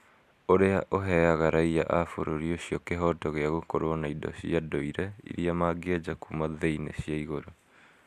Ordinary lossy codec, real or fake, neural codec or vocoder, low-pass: none; real; none; 14.4 kHz